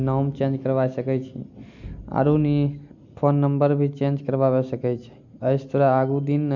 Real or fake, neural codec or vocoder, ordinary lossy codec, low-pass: real; none; none; 7.2 kHz